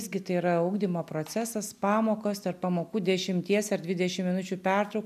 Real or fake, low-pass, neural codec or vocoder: real; 14.4 kHz; none